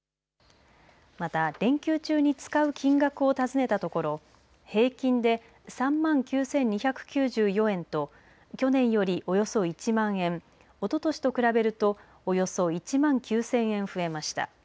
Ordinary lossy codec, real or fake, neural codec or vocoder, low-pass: none; real; none; none